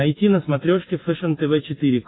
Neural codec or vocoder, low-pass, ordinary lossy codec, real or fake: none; 7.2 kHz; AAC, 16 kbps; real